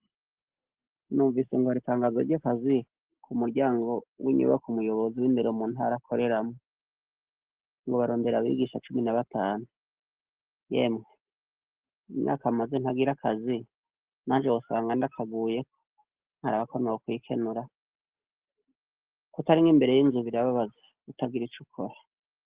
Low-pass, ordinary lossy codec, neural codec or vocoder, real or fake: 3.6 kHz; Opus, 16 kbps; none; real